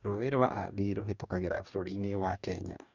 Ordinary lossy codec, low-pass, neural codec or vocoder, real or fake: none; 7.2 kHz; codec, 44.1 kHz, 2.6 kbps, DAC; fake